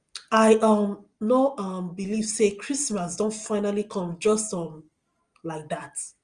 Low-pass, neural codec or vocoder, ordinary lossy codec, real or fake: 9.9 kHz; none; Opus, 32 kbps; real